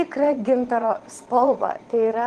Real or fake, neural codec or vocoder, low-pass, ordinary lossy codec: fake; vocoder, 22.05 kHz, 80 mel bands, Vocos; 9.9 kHz; Opus, 16 kbps